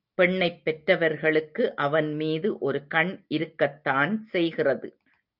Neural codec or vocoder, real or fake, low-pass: none; real; 5.4 kHz